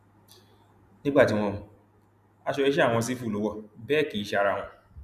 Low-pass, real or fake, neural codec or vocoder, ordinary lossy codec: 14.4 kHz; real; none; none